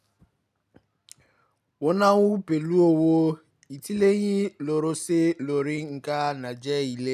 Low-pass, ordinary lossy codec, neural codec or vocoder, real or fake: 14.4 kHz; none; none; real